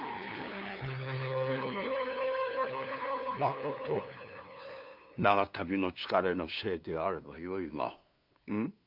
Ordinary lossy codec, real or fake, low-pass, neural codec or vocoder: none; fake; 5.4 kHz; codec, 16 kHz, 2 kbps, FunCodec, trained on LibriTTS, 25 frames a second